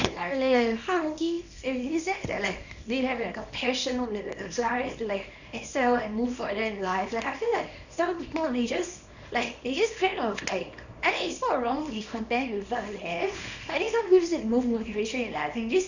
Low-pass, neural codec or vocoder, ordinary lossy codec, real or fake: 7.2 kHz; codec, 24 kHz, 0.9 kbps, WavTokenizer, small release; none; fake